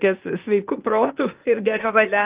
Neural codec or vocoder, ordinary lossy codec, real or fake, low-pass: codec, 16 kHz, 0.8 kbps, ZipCodec; Opus, 32 kbps; fake; 3.6 kHz